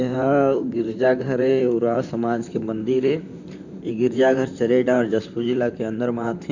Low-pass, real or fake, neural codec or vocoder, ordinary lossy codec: 7.2 kHz; fake; vocoder, 44.1 kHz, 128 mel bands, Pupu-Vocoder; none